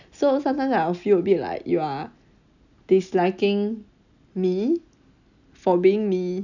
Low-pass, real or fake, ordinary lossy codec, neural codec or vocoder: 7.2 kHz; real; none; none